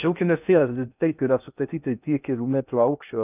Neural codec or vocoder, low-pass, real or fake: codec, 16 kHz in and 24 kHz out, 0.6 kbps, FocalCodec, streaming, 2048 codes; 3.6 kHz; fake